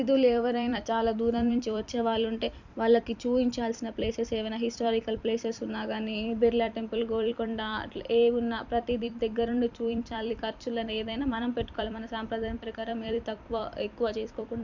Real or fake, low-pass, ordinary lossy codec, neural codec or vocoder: fake; 7.2 kHz; none; vocoder, 44.1 kHz, 128 mel bands every 256 samples, BigVGAN v2